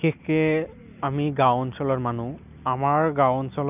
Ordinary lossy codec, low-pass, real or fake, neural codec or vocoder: none; 3.6 kHz; real; none